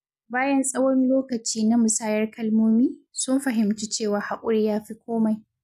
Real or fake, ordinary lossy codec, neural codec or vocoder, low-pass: real; none; none; 14.4 kHz